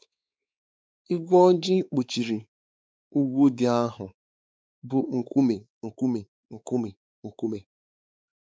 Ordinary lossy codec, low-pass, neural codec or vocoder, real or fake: none; none; codec, 16 kHz, 4 kbps, X-Codec, WavLM features, trained on Multilingual LibriSpeech; fake